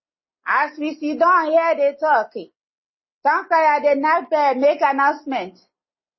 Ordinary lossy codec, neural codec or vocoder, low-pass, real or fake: MP3, 24 kbps; none; 7.2 kHz; real